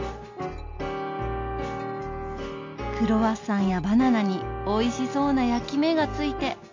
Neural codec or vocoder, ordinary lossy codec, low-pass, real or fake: none; MP3, 48 kbps; 7.2 kHz; real